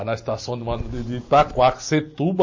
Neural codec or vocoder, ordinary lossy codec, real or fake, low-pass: none; MP3, 32 kbps; real; 7.2 kHz